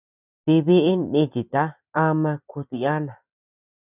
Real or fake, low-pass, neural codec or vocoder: fake; 3.6 kHz; vocoder, 44.1 kHz, 128 mel bands every 256 samples, BigVGAN v2